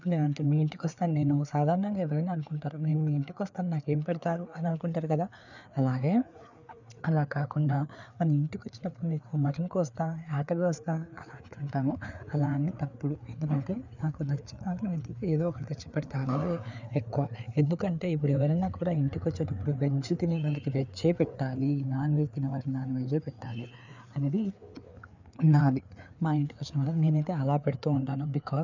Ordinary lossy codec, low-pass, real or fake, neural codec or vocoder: none; 7.2 kHz; fake; codec, 16 kHz, 4 kbps, FreqCodec, larger model